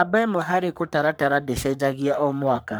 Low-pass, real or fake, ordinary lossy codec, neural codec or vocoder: none; fake; none; codec, 44.1 kHz, 3.4 kbps, Pupu-Codec